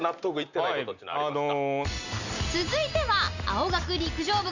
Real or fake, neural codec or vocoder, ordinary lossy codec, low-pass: real; none; Opus, 64 kbps; 7.2 kHz